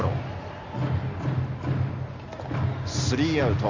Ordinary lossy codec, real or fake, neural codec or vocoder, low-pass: Opus, 64 kbps; real; none; 7.2 kHz